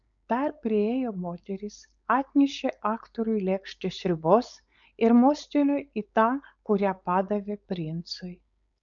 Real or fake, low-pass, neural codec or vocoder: fake; 7.2 kHz; codec, 16 kHz, 4.8 kbps, FACodec